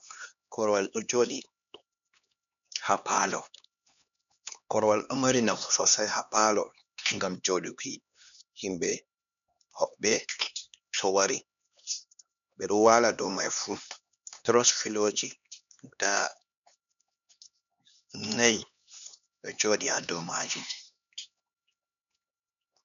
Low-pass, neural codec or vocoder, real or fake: 7.2 kHz; codec, 16 kHz, 2 kbps, X-Codec, HuBERT features, trained on LibriSpeech; fake